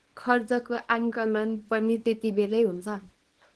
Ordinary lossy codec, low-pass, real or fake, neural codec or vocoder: Opus, 16 kbps; 10.8 kHz; fake; codec, 24 kHz, 0.9 kbps, WavTokenizer, small release